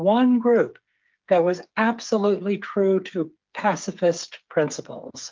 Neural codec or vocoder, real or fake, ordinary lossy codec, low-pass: codec, 16 kHz, 8 kbps, FreqCodec, smaller model; fake; Opus, 32 kbps; 7.2 kHz